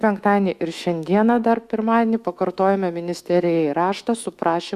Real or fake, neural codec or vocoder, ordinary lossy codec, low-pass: fake; autoencoder, 48 kHz, 128 numbers a frame, DAC-VAE, trained on Japanese speech; Opus, 64 kbps; 14.4 kHz